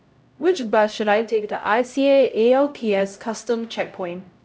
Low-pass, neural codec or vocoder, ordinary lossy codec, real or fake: none; codec, 16 kHz, 0.5 kbps, X-Codec, HuBERT features, trained on LibriSpeech; none; fake